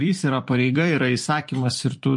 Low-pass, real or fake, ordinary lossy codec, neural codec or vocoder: 10.8 kHz; fake; MP3, 48 kbps; vocoder, 44.1 kHz, 128 mel bands every 256 samples, BigVGAN v2